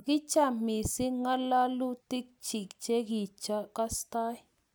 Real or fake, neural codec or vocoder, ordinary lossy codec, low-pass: real; none; none; none